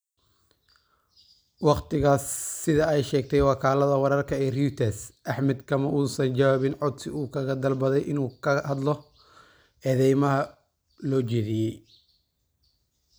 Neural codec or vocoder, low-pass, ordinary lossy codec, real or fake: none; none; none; real